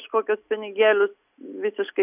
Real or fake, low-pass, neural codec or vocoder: real; 3.6 kHz; none